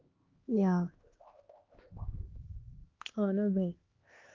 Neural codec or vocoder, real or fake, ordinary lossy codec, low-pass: codec, 16 kHz, 2 kbps, X-Codec, HuBERT features, trained on LibriSpeech; fake; Opus, 32 kbps; 7.2 kHz